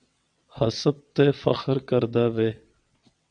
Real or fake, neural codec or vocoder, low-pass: fake; vocoder, 22.05 kHz, 80 mel bands, WaveNeXt; 9.9 kHz